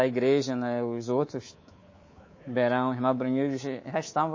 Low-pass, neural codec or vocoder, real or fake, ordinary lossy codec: 7.2 kHz; codec, 24 kHz, 3.1 kbps, DualCodec; fake; MP3, 32 kbps